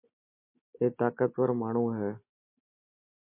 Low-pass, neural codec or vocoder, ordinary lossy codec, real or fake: 3.6 kHz; none; MP3, 24 kbps; real